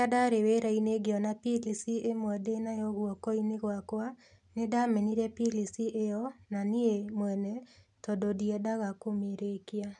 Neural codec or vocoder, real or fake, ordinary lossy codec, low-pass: none; real; none; 10.8 kHz